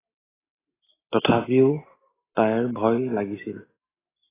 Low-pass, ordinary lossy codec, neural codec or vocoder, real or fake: 3.6 kHz; AAC, 16 kbps; none; real